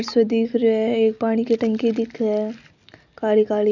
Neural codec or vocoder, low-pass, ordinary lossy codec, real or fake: none; 7.2 kHz; none; real